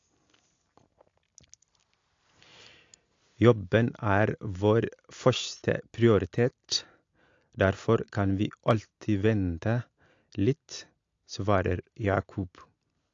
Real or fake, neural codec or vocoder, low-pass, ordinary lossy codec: real; none; 7.2 kHz; AAC, 48 kbps